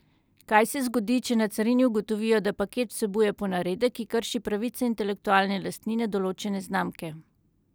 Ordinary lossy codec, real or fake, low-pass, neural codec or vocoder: none; real; none; none